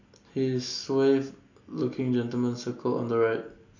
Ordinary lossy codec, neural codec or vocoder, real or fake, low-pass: none; none; real; 7.2 kHz